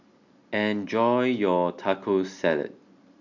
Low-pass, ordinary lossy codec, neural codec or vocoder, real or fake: 7.2 kHz; none; none; real